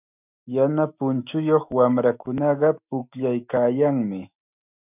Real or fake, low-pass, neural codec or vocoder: fake; 3.6 kHz; autoencoder, 48 kHz, 128 numbers a frame, DAC-VAE, trained on Japanese speech